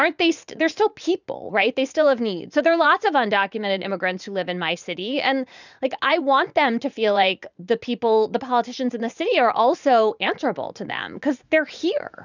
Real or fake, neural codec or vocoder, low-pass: fake; vocoder, 44.1 kHz, 80 mel bands, Vocos; 7.2 kHz